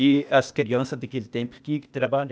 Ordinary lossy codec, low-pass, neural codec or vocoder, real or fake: none; none; codec, 16 kHz, 0.8 kbps, ZipCodec; fake